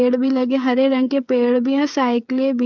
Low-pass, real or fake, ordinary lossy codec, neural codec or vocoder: 7.2 kHz; fake; none; codec, 16 kHz, 8 kbps, FreqCodec, smaller model